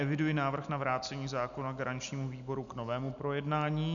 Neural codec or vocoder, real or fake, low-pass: none; real; 7.2 kHz